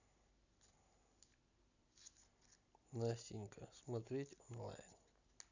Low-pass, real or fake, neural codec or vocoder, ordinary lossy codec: 7.2 kHz; real; none; none